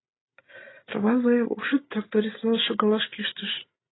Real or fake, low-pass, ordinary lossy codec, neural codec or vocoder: real; 7.2 kHz; AAC, 16 kbps; none